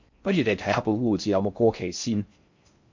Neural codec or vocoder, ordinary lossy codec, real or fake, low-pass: codec, 16 kHz in and 24 kHz out, 0.6 kbps, FocalCodec, streaming, 4096 codes; MP3, 48 kbps; fake; 7.2 kHz